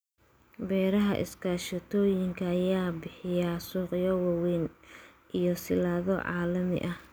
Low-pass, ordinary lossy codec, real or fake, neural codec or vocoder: none; none; real; none